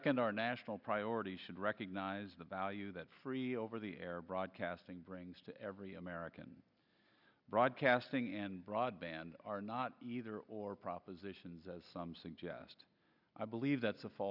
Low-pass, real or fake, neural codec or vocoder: 5.4 kHz; real; none